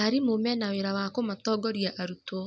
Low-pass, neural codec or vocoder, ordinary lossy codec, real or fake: none; none; none; real